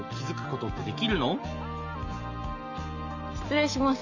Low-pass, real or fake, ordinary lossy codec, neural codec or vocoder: 7.2 kHz; real; none; none